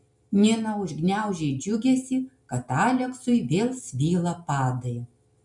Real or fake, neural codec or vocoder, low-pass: real; none; 10.8 kHz